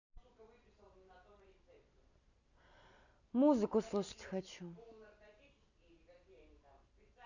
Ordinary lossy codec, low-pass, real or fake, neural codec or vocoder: none; 7.2 kHz; real; none